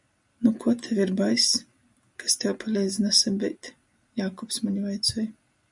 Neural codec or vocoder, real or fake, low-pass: none; real; 10.8 kHz